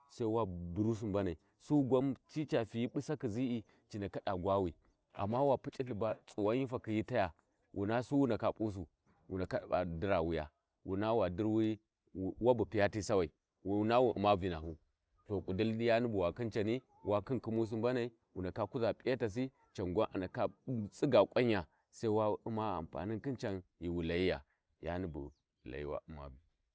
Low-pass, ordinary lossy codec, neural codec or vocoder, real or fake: none; none; none; real